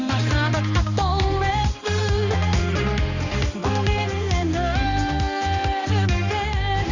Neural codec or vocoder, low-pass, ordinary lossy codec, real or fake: codec, 16 kHz, 2 kbps, X-Codec, HuBERT features, trained on balanced general audio; 7.2 kHz; Opus, 64 kbps; fake